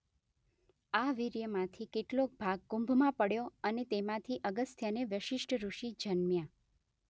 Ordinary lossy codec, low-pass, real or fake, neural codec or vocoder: none; none; real; none